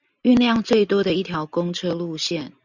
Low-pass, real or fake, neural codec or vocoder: 7.2 kHz; real; none